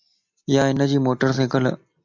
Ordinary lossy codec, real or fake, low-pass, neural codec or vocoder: AAC, 48 kbps; real; 7.2 kHz; none